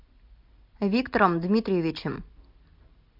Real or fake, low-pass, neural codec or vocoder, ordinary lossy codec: real; 5.4 kHz; none; MP3, 48 kbps